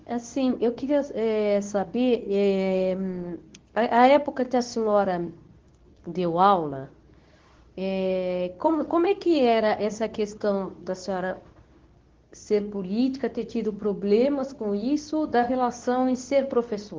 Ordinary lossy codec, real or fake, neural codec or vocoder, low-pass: Opus, 16 kbps; fake; codec, 24 kHz, 0.9 kbps, WavTokenizer, medium speech release version 2; 7.2 kHz